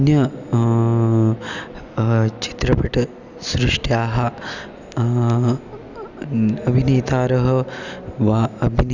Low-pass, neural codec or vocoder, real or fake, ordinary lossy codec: 7.2 kHz; none; real; none